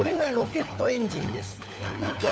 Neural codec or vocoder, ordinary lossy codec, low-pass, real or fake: codec, 16 kHz, 4 kbps, FunCodec, trained on LibriTTS, 50 frames a second; none; none; fake